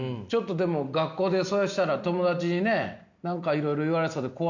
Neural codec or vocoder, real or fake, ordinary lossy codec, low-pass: none; real; none; 7.2 kHz